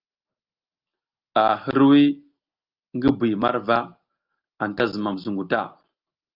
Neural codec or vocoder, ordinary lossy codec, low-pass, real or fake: none; Opus, 24 kbps; 5.4 kHz; real